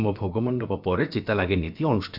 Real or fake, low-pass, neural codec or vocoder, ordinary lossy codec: fake; 5.4 kHz; codec, 16 kHz, about 1 kbps, DyCAST, with the encoder's durations; none